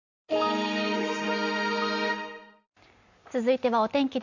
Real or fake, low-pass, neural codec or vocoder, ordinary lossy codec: real; 7.2 kHz; none; none